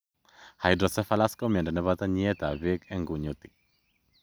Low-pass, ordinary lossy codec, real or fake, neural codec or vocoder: none; none; real; none